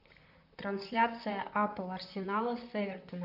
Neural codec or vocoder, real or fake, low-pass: vocoder, 44.1 kHz, 128 mel bands, Pupu-Vocoder; fake; 5.4 kHz